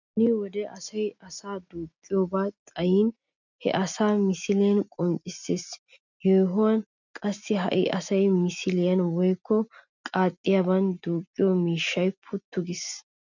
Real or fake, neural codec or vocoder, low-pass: real; none; 7.2 kHz